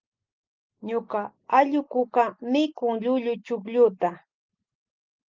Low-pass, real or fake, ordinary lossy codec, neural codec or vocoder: 7.2 kHz; real; Opus, 24 kbps; none